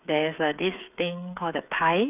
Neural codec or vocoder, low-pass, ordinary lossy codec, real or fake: codec, 16 kHz, 8 kbps, FreqCodec, smaller model; 3.6 kHz; none; fake